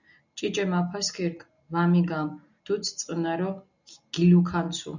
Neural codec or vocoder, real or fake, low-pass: none; real; 7.2 kHz